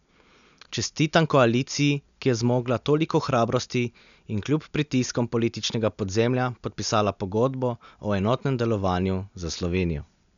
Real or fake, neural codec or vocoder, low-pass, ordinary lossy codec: real; none; 7.2 kHz; none